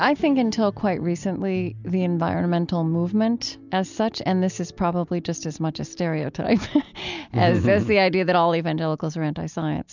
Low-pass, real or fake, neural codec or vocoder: 7.2 kHz; real; none